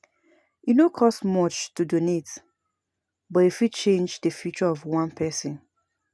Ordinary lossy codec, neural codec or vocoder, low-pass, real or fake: none; none; none; real